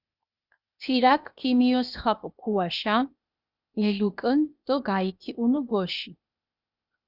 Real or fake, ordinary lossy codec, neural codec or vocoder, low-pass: fake; Opus, 64 kbps; codec, 16 kHz, 0.8 kbps, ZipCodec; 5.4 kHz